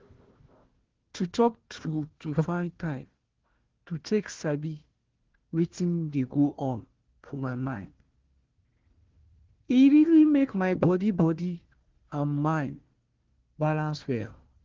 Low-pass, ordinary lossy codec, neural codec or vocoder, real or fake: 7.2 kHz; Opus, 16 kbps; codec, 16 kHz, 1 kbps, FunCodec, trained on Chinese and English, 50 frames a second; fake